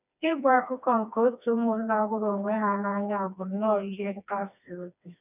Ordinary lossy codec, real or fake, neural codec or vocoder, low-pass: none; fake; codec, 16 kHz, 2 kbps, FreqCodec, smaller model; 3.6 kHz